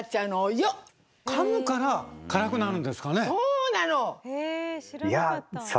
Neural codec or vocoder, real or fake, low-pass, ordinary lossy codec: none; real; none; none